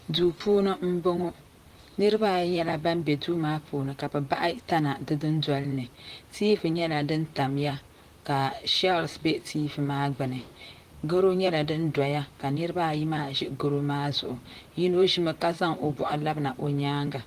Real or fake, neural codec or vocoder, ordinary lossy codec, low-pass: fake; vocoder, 44.1 kHz, 128 mel bands, Pupu-Vocoder; Opus, 24 kbps; 14.4 kHz